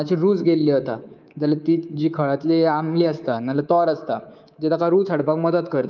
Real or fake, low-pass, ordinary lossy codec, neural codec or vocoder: fake; 7.2 kHz; Opus, 24 kbps; codec, 24 kHz, 3.1 kbps, DualCodec